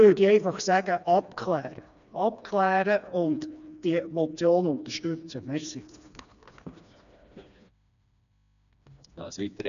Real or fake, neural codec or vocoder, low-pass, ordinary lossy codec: fake; codec, 16 kHz, 2 kbps, FreqCodec, smaller model; 7.2 kHz; none